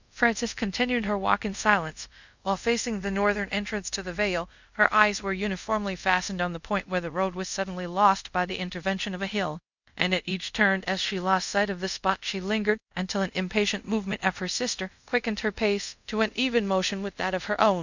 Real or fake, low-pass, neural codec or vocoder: fake; 7.2 kHz; codec, 24 kHz, 0.5 kbps, DualCodec